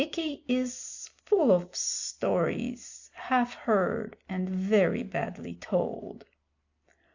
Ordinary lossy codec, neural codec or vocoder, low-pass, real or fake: AAC, 48 kbps; none; 7.2 kHz; real